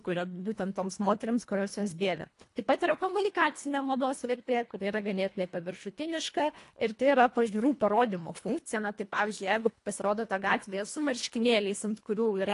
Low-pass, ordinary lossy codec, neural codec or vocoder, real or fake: 10.8 kHz; AAC, 48 kbps; codec, 24 kHz, 1.5 kbps, HILCodec; fake